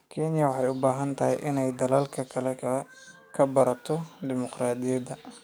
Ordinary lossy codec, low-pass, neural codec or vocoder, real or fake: none; none; codec, 44.1 kHz, 7.8 kbps, DAC; fake